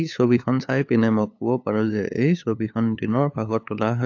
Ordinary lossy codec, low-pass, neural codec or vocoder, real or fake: AAC, 48 kbps; 7.2 kHz; codec, 16 kHz, 8 kbps, FunCodec, trained on LibriTTS, 25 frames a second; fake